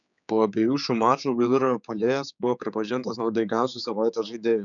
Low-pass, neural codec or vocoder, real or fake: 7.2 kHz; codec, 16 kHz, 4 kbps, X-Codec, HuBERT features, trained on general audio; fake